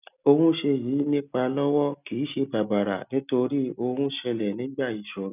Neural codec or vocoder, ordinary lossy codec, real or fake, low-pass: none; none; real; 3.6 kHz